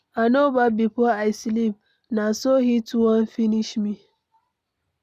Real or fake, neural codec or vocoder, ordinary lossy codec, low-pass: real; none; none; 14.4 kHz